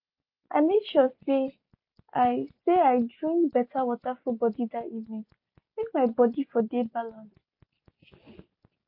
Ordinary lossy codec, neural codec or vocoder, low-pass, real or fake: MP3, 32 kbps; none; 5.4 kHz; real